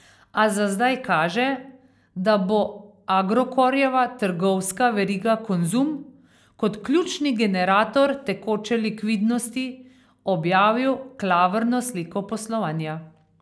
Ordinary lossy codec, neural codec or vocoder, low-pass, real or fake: none; none; none; real